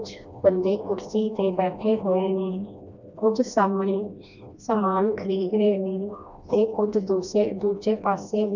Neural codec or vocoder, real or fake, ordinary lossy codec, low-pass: codec, 16 kHz, 1 kbps, FreqCodec, smaller model; fake; none; 7.2 kHz